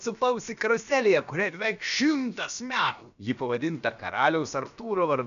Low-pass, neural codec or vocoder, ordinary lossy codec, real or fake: 7.2 kHz; codec, 16 kHz, about 1 kbps, DyCAST, with the encoder's durations; MP3, 96 kbps; fake